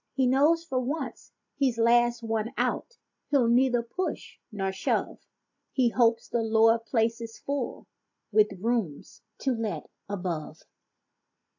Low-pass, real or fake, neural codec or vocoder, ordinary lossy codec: 7.2 kHz; real; none; AAC, 48 kbps